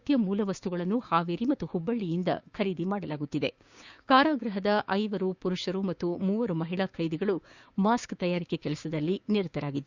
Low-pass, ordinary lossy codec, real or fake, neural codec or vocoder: 7.2 kHz; Opus, 64 kbps; fake; codec, 16 kHz, 6 kbps, DAC